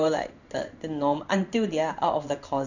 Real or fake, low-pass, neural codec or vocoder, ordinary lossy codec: fake; 7.2 kHz; codec, 16 kHz in and 24 kHz out, 1 kbps, XY-Tokenizer; none